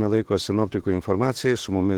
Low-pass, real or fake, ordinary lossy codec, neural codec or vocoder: 19.8 kHz; fake; Opus, 16 kbps; autoencoder, 48 kHz, 32 numbers a frame, DAC-VAE, trained on Japanese speech